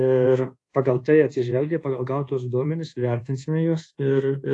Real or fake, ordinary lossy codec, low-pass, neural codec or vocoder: fake; AAC, 48 kbps; 10.8 kHz; codec, 24 kHz, 1.2 kbps, DualCodec